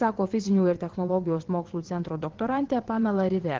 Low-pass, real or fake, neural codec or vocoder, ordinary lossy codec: 7.2 kHz; fake; vocoder, 22.05 kHz, 80 mel bands, WaveNeXt; Opus, 16 kbps